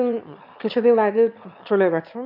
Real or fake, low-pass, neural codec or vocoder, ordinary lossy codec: fake; 5.4 kHz; autoencoder, 22.05 kHz, a latent of 192 numbers a frame, VITS, trained on one speaker; MP3, 48 kbps